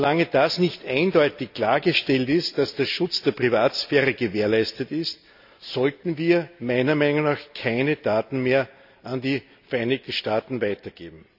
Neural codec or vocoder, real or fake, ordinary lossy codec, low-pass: none; real; none; 5.4 kHz